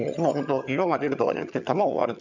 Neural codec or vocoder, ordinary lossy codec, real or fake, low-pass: vocoder, 22.05 kHz, 80 mel bands, HiFi-GAN; none; fake; 7.2 kHz